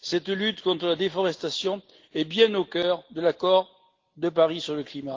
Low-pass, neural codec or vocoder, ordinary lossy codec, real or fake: 7.2 kHz; none; Opus, 16 kbps; real